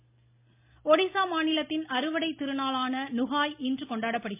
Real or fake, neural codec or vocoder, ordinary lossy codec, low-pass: real; none; MP3, 32 kbps; 3.6 kHz